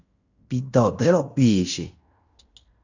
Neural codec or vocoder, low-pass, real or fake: codec, 16 kHz in and 24 kHz out, 0.9 kbps, LongCat-Audio-Codec, fine tuned four codebook decoder; 7.2 kHz; fake